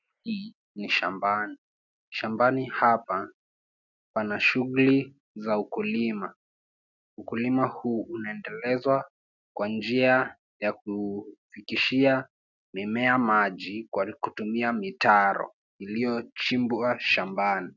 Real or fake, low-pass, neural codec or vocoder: real; 7.2 kHz; none